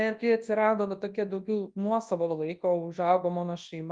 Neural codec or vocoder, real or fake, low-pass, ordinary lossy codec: codec, 24 kHz, 0.9 kbps, WavTokenizer, large speech release; fake; 9.9 kHz; Opus, 32 kbps